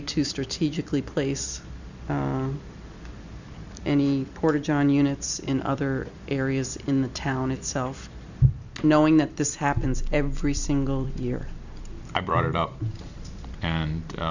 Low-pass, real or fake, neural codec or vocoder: 7.2 kHz; fake; vocoder, 44.1 kHz, 128 mel bands every 256 samples, BigVGAN v2